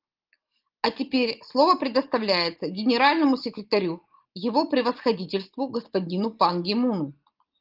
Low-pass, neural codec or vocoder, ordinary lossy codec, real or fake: 5.4 kHz; none; Opus, 32 kbps; real